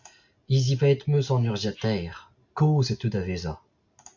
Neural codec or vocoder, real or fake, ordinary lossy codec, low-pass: none; real; MP3, 64 kbps; 7.2 kHz